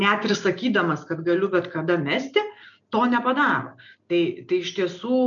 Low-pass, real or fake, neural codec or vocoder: 7.2 kHz; real; none